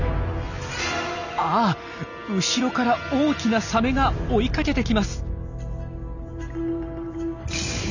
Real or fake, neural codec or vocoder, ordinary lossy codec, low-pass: real; none; none; 7.2 kHz